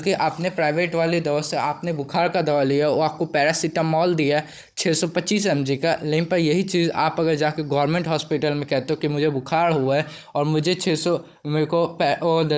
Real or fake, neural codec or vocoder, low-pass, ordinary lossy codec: fake; codec, 16 kHz, 16 kbps, FunCodec, trained on Chinese and English, 50 frames a second; none; none